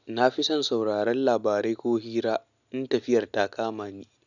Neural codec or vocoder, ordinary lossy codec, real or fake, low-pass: none; none; real; 7.2 kHz